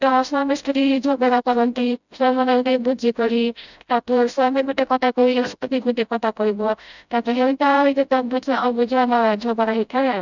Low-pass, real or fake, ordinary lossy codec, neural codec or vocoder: 7.2 kHz; fake; none; codec, 16 kHz, 0.5 kbps, FreqCodec, smaller model